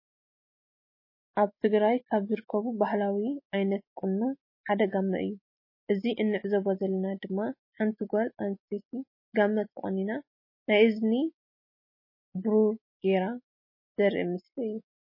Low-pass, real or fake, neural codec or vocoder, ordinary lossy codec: 5.4 kHz; real; none; MP3, 24 kbps